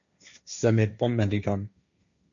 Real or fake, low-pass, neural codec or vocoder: fake; 7.2 kHz; codec, 16 kHz, 1.1 kbps, Voila-Tokenizer